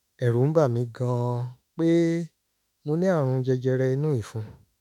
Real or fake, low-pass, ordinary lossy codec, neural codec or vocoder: fake; 19.8 kHz; none; autoencoder, 48 kHz, 32 numbers a frame, DAC-VAE, trained on Japanese speech